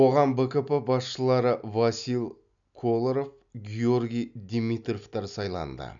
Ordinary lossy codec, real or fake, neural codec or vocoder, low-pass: none; real; none; 7.2 kHz